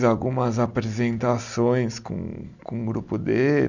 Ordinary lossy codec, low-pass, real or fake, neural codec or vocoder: none; 7.2 kHz; real; none